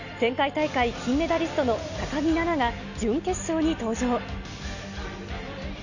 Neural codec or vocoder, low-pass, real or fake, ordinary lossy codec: none; 7.2 kHz; real; none